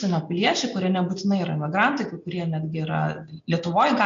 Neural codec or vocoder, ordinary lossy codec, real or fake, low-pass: none; MP3, 48 kbps; real; 7.2 kHz